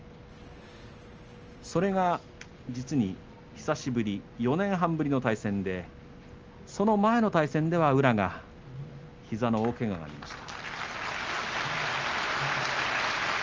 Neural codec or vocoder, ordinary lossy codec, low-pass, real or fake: none; Opus, 24 kbps; 7.2 kHz; real